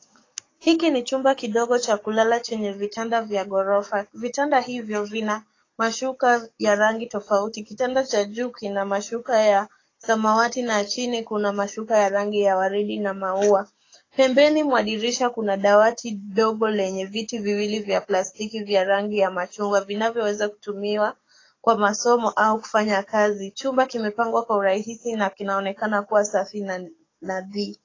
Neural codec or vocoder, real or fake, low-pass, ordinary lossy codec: codec, 44.1 kHz, 7.8 kbps, DAC; fake; 7.2 kHz; AAC, 32 kbps